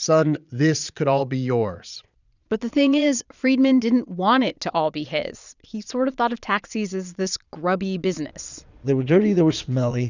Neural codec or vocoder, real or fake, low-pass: vocoder, 22.05 kHz, 80 mel bands, Vocos; fake; 7.2 kHz